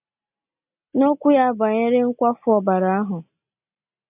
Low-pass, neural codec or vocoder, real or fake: 3.6 kHz; none; real